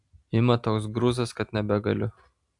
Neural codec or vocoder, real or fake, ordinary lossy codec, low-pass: none; real; AAC, 64 kbps; 10.8 kHz